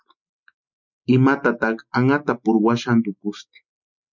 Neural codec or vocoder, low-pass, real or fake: none; 7.2 kHz; real